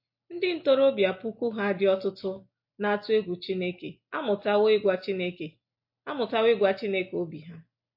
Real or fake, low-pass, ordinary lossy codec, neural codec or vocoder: real; 5.4 kHz; MP3, 32 kbps; none